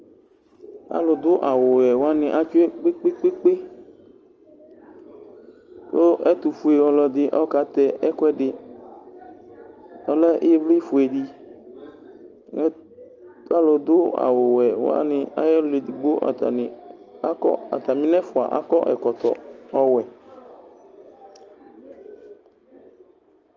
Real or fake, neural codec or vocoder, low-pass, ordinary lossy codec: real; none; 7.2 kHz; Opus, 24 kbps